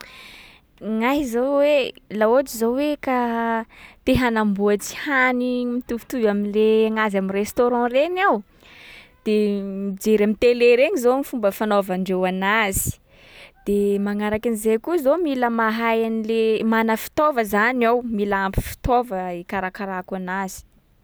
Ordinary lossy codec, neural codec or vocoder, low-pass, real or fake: none; none; none; real